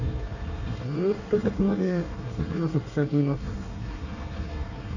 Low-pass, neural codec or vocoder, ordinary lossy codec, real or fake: 7.2 kHz; codec, 24 kHz, 1 kbps, SNAC; none; fake